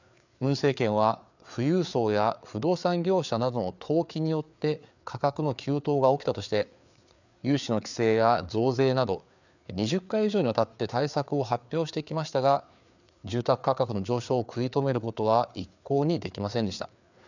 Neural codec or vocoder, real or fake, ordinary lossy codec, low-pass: codec, 16 kHz, 4 kbps, FreqCodec, larger model; fake; none; 7.2 kHz